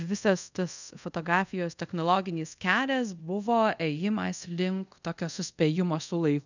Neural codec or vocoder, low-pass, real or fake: codec, 24 kHz, 0.5 kbps, DualCodec; 7.2 kHz; fake